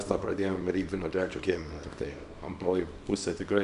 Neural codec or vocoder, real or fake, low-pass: codec, 24 kHz, 0.9 kbps, WavTokenizer, small release; fake; 10.8 kHz